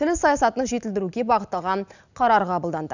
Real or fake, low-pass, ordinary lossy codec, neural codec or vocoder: real; 7.2 kHz; none; none